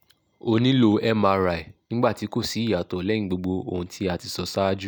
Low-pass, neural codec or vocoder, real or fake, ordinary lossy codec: none; none; real; none